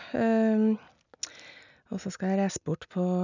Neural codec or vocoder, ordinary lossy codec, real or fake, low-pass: none; none; real; 7.2 kHz